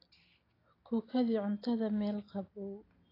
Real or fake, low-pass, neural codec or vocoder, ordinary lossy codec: real; 5.4 kHz; none; AAC, 24 kbps